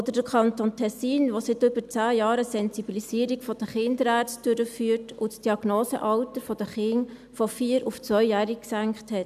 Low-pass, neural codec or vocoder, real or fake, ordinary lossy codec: 14.4 kHz; none; real; none